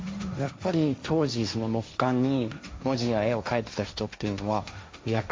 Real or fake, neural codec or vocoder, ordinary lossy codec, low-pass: fake; codec, 16 kHz, 1.1 kbps, Voila-Tokenizer; none; none